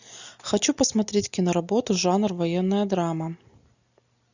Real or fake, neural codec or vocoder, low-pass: real; none; 7.2 kHz